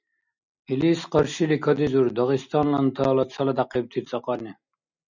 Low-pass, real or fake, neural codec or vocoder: 7.2 kHz; real; none